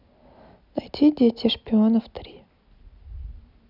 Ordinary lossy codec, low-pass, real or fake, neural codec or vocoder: none; 5.4 kHz; real; none